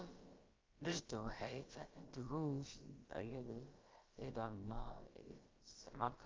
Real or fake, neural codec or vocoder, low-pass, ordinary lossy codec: fake; codec, 16 kHz, about 1 kbps, DyCAST, with the encoder's durations; 7.2 kHz; Opus, 24 kbps